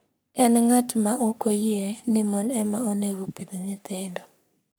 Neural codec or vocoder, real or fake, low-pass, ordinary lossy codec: codec, 44.1 kHz, 3.4 kbps, Pupu-Codec; fake; none; none